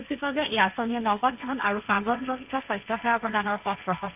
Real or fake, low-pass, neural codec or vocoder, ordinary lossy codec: fake; 3.6 kHz; codec, 16 kHz, 1.1 kbps, Voila-Tokenizer; none